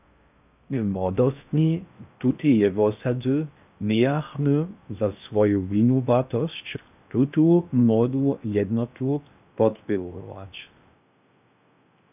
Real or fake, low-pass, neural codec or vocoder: fake; 3.6 kHz; codec, 16 kHz in and 24 kHz out, 0.6 kbps, FocalCodec, streaming, 4096 codes